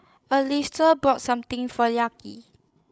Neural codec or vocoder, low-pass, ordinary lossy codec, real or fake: codec, 16 kHz, 16 kbps, FreqCodec, larger model; none; none; fake